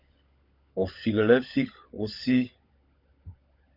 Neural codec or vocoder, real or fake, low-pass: codec, 16 kHz, 16 kbps, FunCodec, trained on LibriTTS, 50 frames a second; fake; 5.4 kHz